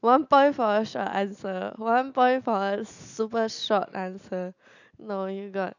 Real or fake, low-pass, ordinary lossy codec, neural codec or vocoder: real; 7.2 kHz; none; none